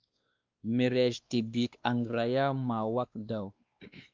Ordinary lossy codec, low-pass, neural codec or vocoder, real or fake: Opus, 24 kbps; 7.2 kHz; codec, 16 kHz, 2 kbps, X-Codec, WavLM features, trained on Multilingual LibriSpeech; fake